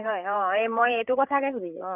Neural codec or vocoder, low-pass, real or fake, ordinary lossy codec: codec, 16 kHz, 8 kbps, FreqCodec, larger model; 3.6 kHz; fake; none